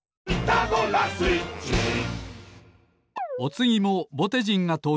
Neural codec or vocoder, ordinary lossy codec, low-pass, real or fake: none; none; none; real